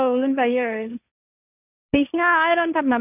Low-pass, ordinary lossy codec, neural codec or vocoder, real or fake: 3.6 kHz; none; codec, 16 kHz, 1.1 kbps, Voila-Tokenizer; fake